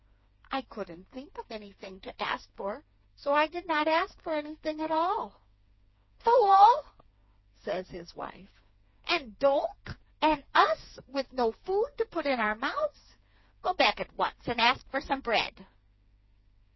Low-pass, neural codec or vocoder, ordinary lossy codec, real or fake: 7.2 kHz; codec, 16 kHz, 4 kbps, FreqCodec, smaller model; MP3, 24 kbps; fake